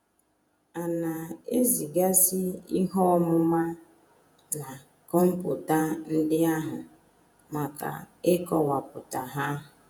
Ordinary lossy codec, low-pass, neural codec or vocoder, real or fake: none; none; vocoder, 48 kHz, 128 mel bands, Vocos; fake